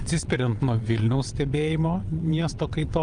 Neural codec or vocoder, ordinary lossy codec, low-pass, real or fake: vocoder, 22.05 kHz, 80 mel bands, WaveNeXt; Opus, 32 kbps; 9.9 kHz; fake